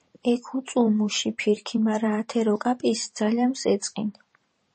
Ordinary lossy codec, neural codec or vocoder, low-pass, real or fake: MP3, 32 kbps; vocoder, 44.1 kHz, 128 mel bands every 512 samples, BigVGAN v2; 10.8 kHz; fake